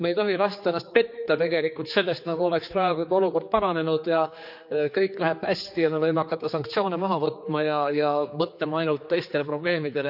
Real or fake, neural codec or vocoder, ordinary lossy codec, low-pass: fake; codec, 16 kHz, 4 kbps, X-Codec, HuBERT features, trained on general audio; none; 5.4 kHz